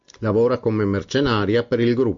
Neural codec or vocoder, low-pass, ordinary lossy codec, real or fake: none; 7.2 kHz; MP3, 48 kbps; real